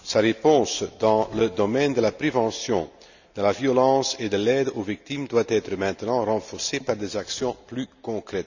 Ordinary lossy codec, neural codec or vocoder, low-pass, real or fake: none; none; 7.2 kHz; real